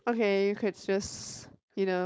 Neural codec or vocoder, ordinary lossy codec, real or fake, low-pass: codec, 16 kHz, 4.8 kbps, FACodec; none; fake; none